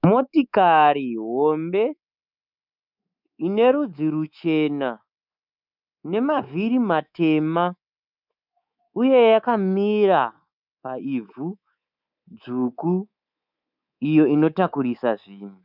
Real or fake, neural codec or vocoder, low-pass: fake; codec, 24 kHz, 3.1 kbps, DualCodec; 5.4 kHz